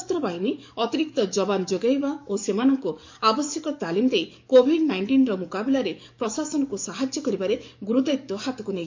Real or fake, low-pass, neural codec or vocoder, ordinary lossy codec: fake; 7.2 kHz; codec, 44.1 kHz, 7.8 kbps, DAC; MP3, 48 kbps